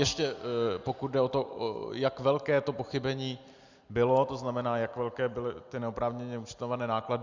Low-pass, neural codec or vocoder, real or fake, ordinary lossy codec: 7.2 kHz; none; real; Opus, 64 kbps